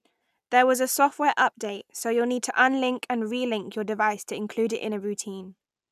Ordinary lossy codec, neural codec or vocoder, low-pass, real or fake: none; none; 14.4 kHz; real